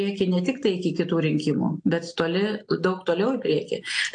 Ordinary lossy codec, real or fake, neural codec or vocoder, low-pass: AAC, 48 kbps; real; none; 9.9 kHz